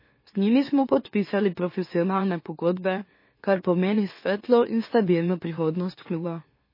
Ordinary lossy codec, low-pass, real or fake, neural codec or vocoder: MP3, 24 kbps; 5.4 kHz; fake; autoencoder, 44.1 kHz, a latent of 192 numbers a frame, MeloTTS